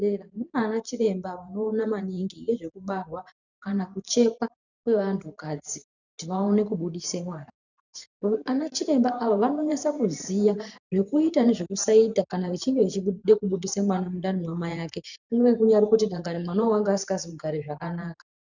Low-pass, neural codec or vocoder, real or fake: 7.2 kHz; vocoder, 44.1 kHz, 128 mel bands every 512 samples, BigVGAN v2; fake